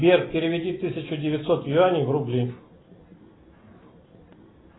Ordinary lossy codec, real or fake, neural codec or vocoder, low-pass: AAC, 16 kbps; real; none; 7.2 kHz